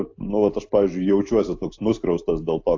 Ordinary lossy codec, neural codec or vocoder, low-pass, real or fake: AAC, 48 kbps; none; 7.2 kHz; real